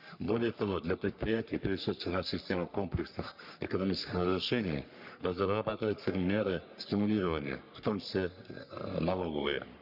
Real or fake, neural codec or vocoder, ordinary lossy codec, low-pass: fake; codec, 44.1 kHz, 3.4 kbps, Pupu-Codec; Opus, 64 kbps; 5.4 kHz